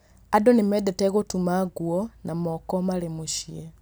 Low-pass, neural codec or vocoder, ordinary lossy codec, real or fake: none; none; none; real